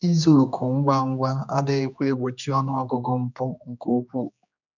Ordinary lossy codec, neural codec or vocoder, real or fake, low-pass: none; codec, 16 kHz, 2 kbps, X-Codec, HuBERT features, trained on general audio; fake; 7.2 kHz